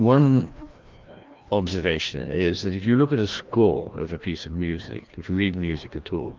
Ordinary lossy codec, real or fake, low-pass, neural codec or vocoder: Opus, 24 kbps; fake; 7.2 kHz; codec, 16 kHz, 1 kbps, FreqCodec, larger model